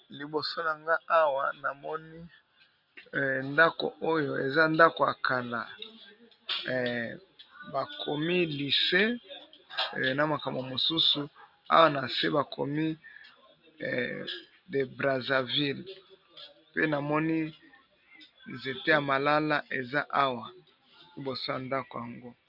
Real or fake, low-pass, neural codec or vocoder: real; 5.4 kHz; none